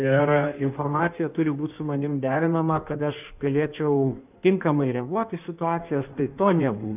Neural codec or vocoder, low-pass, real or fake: codec, 16 kHz in and 24 kHz out, 1.1 kbps, FireRedTTS-2 codec; 3.6 kHz; fake